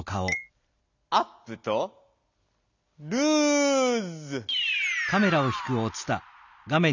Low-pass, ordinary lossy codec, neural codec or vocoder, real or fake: 7.2 kHz; none; none; real